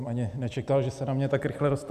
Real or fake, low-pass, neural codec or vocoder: fake; 14.4 kHz; vocoder, 44.1 kHz, 128 mel bands every 256 samples, BigVGAN v2